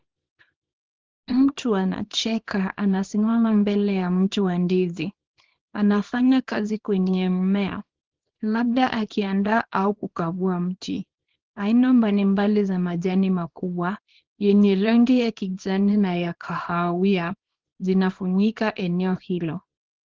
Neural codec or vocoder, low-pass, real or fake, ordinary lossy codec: codec, 24 kHz, 0.9 kbps, WavTokenizer, small release; 7.2 kHz; fake; Opus, 16 kbps